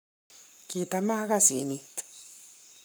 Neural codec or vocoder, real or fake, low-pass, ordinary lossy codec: codec, 44.1 kHz, 7.8 kbps, Pupu-Codec; fake; none; none